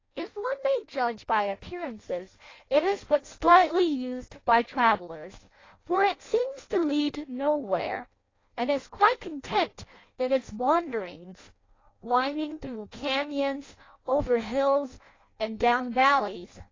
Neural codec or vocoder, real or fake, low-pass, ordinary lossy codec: codec, 16 kHz in and 24 kHz out, 0.6 kbps, FireRedTTS-2 codec; fake; 7.2 kHz; AAC, 32 kbps